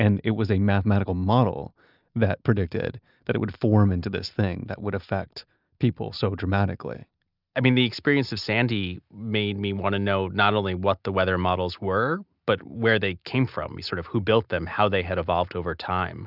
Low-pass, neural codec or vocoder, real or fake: 5.4 kHz; none; real